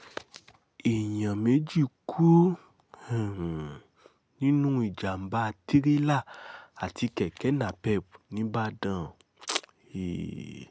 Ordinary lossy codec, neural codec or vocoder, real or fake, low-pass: none; none; real; none